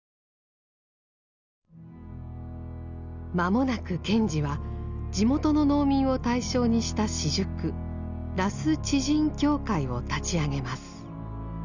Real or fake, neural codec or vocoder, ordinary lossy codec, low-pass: real; none; none; 7.2 kHz